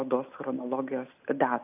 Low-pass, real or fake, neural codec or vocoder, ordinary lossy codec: 3.6 kHz; real; none; AAC, 24 kbps